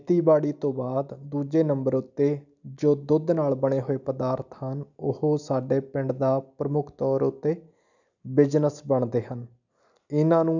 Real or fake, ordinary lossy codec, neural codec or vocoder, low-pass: real; none; none; 7.2 kHz